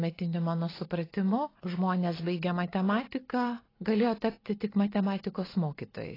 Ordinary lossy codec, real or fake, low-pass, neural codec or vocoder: AAC, 24 kbps; real; 5.4 kHz; none